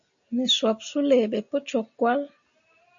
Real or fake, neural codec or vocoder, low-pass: real; none; 7.2 kHz